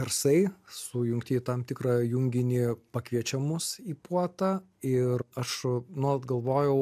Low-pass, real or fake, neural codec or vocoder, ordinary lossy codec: 14.4 kHz; real; none; MP3, 96 kbps